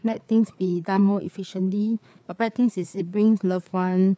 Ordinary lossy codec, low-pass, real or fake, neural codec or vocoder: none; none; fake; codec, 16 kHz, 4 kbps, FreqCodec, larger model